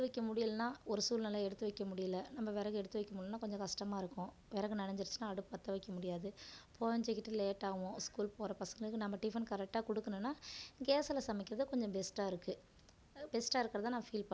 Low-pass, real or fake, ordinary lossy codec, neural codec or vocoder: none; real; none; none